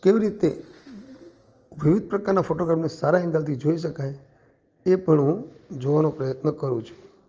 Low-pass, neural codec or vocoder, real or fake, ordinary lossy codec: 7.2 kHz; none; real; Opus, 24 kbps